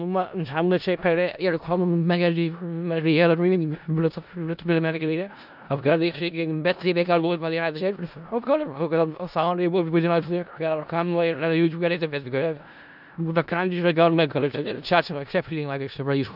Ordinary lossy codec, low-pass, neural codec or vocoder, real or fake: none; 5.4 kHz; codec, 16 kHz in and 24 kHz out, 0.4 kbps, LongCat-Audio-Codec, four codebook decoder; fake